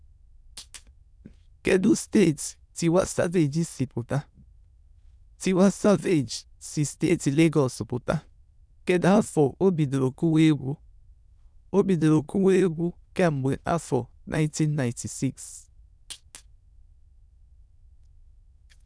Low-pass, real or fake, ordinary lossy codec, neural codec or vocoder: none; fake; none; autoencoder, 22.05 kHz, a latent of 192 numbers a frame, VITS, trained on many speakers